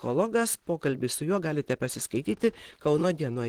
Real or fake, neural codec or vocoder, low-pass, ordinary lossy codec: fake; vocoder, 44.1 kHz, 128 mel bands, Pupu-Vocoder; 19.8 kHz; Opus, 16 kbps